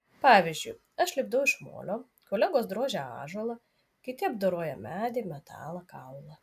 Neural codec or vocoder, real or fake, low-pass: none; real; 14.4 kHz